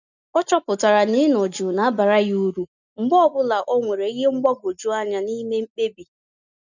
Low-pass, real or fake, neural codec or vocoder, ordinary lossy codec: 7.2 kHz; real; none; none